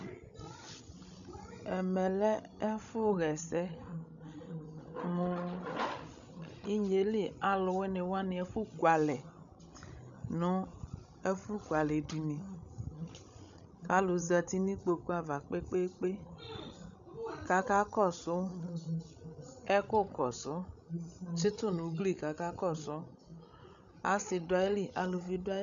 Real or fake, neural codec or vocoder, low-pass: fake; codec, 16 kHz, 16 kbps, FreqCodec, larger model; 7.2 kHz